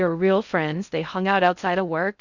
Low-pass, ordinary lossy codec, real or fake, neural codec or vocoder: 7.2 kHz; Opus, 64 kbps; fake; codec, 16 kHz in and 24 kHz out, 0.6 kbps, FocalCodec, streaming, 2048 codes